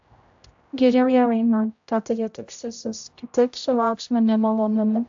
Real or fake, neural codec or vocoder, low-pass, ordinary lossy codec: fake; codec, 16 kHz, 0.5 kbps, X-Codec, HuBERT features, trained on general audio; 7.2 kHz; AAC, 64 kbps